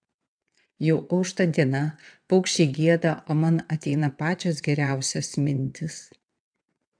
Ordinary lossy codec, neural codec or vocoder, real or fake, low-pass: AAC, 64 kbps; vocoder, 22.05 kHz, 80 mel bands, WaveNeXt; fake; 9.9 kHz